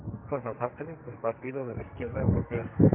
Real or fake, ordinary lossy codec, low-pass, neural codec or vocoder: fake; MP3, 32 kbps; 3.6 kHz; codec, 44.1 kHz, 2.6 kbps, SNAC